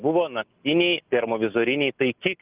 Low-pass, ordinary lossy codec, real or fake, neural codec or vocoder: 3.6 kHz; Opus, 32 kbps; real; none